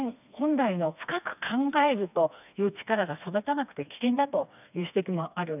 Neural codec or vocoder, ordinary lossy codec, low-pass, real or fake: codec, 16 kHz, 2 kbps, FreqCodec, smaller model; none; 3.6 kHz; fake